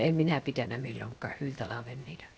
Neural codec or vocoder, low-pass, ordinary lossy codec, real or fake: codec, 16 kHz, 0.7 kbps, FocalCodec; none; none; fake